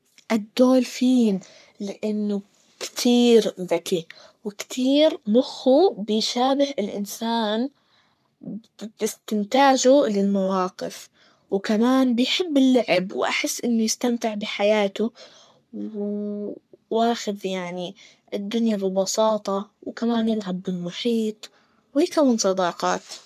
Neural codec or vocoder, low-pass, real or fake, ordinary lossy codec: codec, 44.1 kHz, 3.4 kbps, Pupu-Codec; 14.4 kHz; fake; none